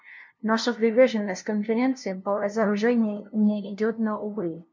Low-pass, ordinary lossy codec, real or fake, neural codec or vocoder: 7.2 kHz; MP3, 48 kbps; fake; codec, 16 kHz, 0.5 kbps, FunCodec, trained on LibriTTS, 25 frames a second